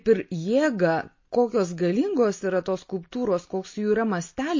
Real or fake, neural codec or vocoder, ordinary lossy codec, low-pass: real; none; MP3, 32 kbps; 7.2 kHz